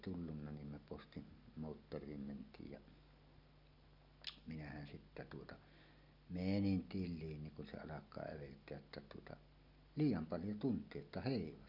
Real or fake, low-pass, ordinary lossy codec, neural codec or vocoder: real; 5.4 kHz; none; none